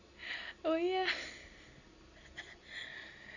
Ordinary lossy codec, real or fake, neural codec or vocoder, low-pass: none; real; none; 7.2 kHz